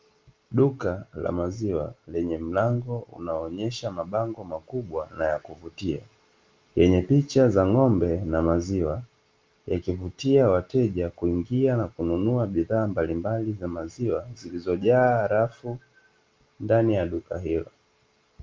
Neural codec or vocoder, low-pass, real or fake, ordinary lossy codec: none; 7.2 kHz; real; Opus, 32 kbps